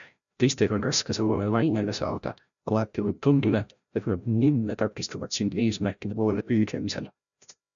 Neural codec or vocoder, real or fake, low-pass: codec, 16 kHz, 0.5 kbps, FreqCodec, larger model; fake; 7.2 kHz